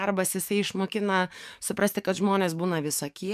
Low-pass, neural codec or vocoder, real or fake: 14.4 kHz; codec, 44.1 kHz, 7.8 kbps, DAC; fake